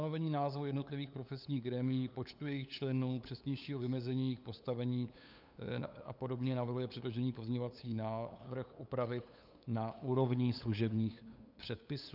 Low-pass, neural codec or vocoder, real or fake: 5.4 kHz; codec, 16 kHz, 8 kbps, FunCodec, trained on LibriTTS, 25 frames a second; fake